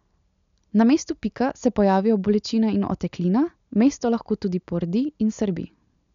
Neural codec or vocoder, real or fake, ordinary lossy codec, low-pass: none; real; none; 7.2 kHz